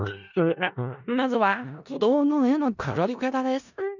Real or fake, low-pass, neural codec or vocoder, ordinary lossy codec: fake; 7.2 kHz; codec, 16 kHz in and 24 kHz out, 0.4 kbps, LongCat-Audio-Codec, four codebook decoder; none